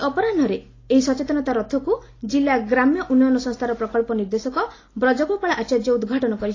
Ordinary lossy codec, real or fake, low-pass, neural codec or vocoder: AAC, 32 kbps; real; 7.2 kHz; none